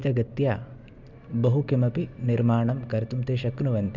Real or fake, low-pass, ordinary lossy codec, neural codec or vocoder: real; 7.2 kHz; Opus, 64 kbps; none